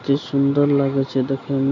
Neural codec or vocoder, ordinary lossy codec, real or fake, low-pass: none; none; real; 7.2 kHz